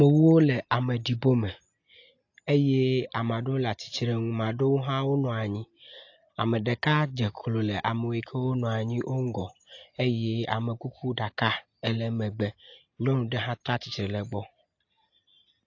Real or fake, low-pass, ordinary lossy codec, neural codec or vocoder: real; 7.2 kHz; AAC, 48 kbps; none